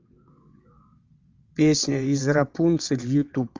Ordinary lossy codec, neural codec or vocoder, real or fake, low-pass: Opus, 32 kbps; vocoder, 22.05 kHz, 80 mel bands, WaveNeXt; fake; 7.2 kHz